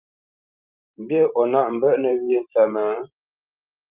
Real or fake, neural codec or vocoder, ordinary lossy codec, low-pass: fake; vocoder, 44.1 kHz, 128 mel bands every 512 samples, BigVGAN v2; Opus, 24 kbps; 3.6 kHz